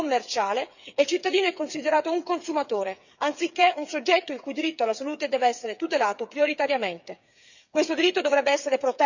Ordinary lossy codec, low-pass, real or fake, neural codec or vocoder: none; 7.2 kHz; fake; codec, 16 kHz, 8 kbps, FreqCodec, smaller model